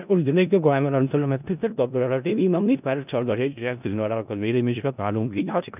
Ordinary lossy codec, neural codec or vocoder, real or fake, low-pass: none; codec, 16 kHz in and 24 kHz out, 0.4 kbps, LongCat-Audio-Codec, four codebook decoder; fake; 3.6 kHz